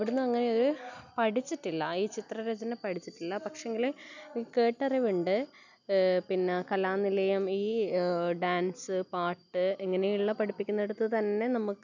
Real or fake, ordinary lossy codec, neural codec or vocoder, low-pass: real; none; none; 7.2 kHz